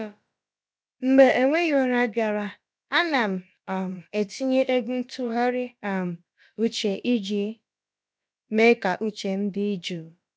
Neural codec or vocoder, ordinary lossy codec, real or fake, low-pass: codec, 16 kHz, about 1 kbps, DyCAST, with the encoder's durations; none; fake; none